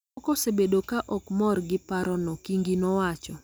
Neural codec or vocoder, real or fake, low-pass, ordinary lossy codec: none; real; none; none